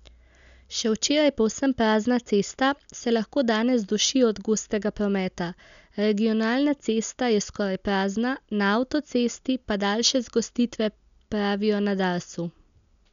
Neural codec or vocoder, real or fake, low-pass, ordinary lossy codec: none; real; 7.2 kHz; none